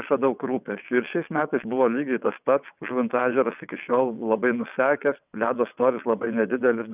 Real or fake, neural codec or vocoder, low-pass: fake; vocoder, 22.05 kHz, 80 mel bands, WaveNeXt; 3.6 kHz